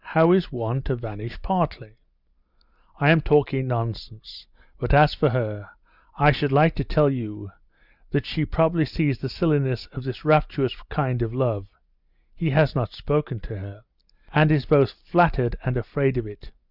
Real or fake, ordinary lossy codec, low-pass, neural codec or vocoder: real; Opus, 64 kbps; 5.4 kHz; none